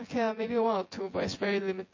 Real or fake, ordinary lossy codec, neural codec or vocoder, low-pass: fake; MP3, 32 kbps; vocoder, 24 kHz, 100 mel bands, Vocos; 7.2 kHz